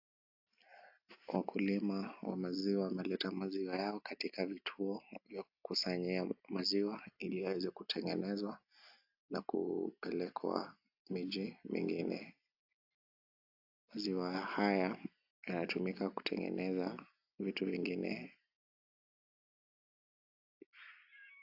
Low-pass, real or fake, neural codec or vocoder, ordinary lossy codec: 5.4 kHz; real; none; AAC, 48 kbps